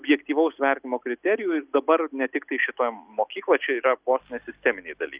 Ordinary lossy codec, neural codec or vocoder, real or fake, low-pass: Opus, 64 kbps; none; real; 3.6 kHz